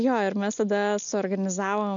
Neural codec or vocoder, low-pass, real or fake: none; 7.2 kHz; real